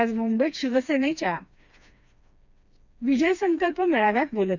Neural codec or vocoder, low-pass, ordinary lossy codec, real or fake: codec, 16 kHz, 2 kbps, FreqCodec, smaller model; 7.2 kHz; none; fake